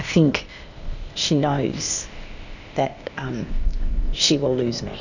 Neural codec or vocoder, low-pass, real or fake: codec, 16 kHz, 0.8 kbps, ZipCodec; 7.2 kHz; fake